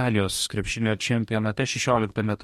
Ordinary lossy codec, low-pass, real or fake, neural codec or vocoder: MP3, 64 kbps; 19.8 kHz; fake; codec, 44.1 kHz, 2.6 kbps, DAC